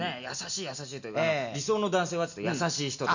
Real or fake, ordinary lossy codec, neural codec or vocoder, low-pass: real; none; none; 7.2 kHz